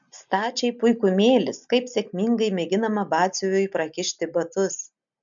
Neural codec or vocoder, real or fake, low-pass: none; real; 7.2 kHz